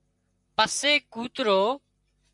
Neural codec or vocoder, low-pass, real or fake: vocoder, 44.1 kHz, 128 mel bands, Pupu-Vocoder; 10.8 kHz; fake